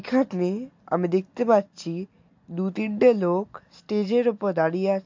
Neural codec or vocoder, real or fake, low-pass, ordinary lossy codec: none; real; 7.2 kHz; MP3, 48 kbps